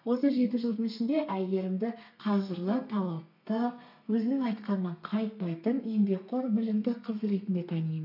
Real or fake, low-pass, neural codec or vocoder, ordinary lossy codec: fake; 5.4 kHz; codec, 32 kHz, 1.9 kbps, SNAC; none